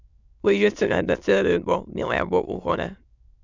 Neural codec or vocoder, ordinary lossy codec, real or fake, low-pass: autoencoder, 22.05 kHz, a latent of 192 numbers a frame, VITS, trained on many speakers; none; fake; 7.2 kHz